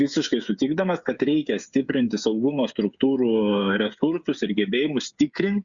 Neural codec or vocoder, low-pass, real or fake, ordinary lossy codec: codec, 16 kHz, 8 kbps, FreqCodec, smaller model; 7.2 kHz; fake; Opus, 64 kbps